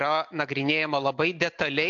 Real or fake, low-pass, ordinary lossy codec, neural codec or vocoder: real; 7.2 kHz; AAC, 64 kbps; none